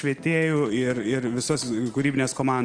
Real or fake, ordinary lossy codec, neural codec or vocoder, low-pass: real; Opus, 24 kbps; none; 9.9 kHz